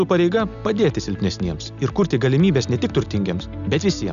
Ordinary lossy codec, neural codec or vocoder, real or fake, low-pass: MP3, 96 kbps; none; real; 7.2 kHz